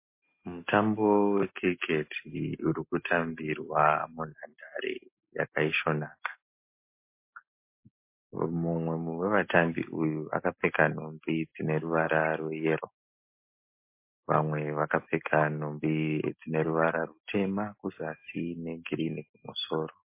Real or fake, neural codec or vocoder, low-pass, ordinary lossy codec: real; none; 3.6 kHz; MP3, 24 kbps